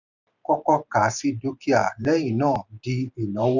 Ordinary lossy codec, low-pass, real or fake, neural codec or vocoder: none; 7.2 kHz; fake; vocoder, 44.1 kHz, 128 mel bands every 512 samples, BigVGAN v2